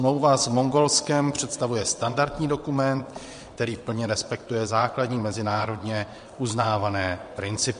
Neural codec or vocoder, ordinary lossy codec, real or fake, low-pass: vocoder, 22.05 kHz, 80 mel bands, WaveNeXt; MP3, 48 kbps; fake; 9.9 kHz